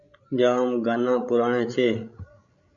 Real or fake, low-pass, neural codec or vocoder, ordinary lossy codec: fake; 7.2 kHz; codec, 16 kHz, 16 kbps, FreqCodec, larger model; AAC, 64 kbps